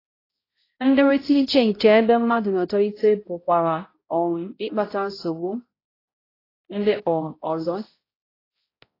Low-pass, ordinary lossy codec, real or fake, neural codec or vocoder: 5.4 kHz; AAC, 24 kbps; fake; codec, 16 kHz, 0.5 kbps, X-Codec, HuBERT features, trained on balanced general audio